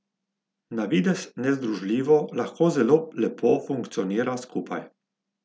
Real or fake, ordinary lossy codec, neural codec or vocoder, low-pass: real; none; none; none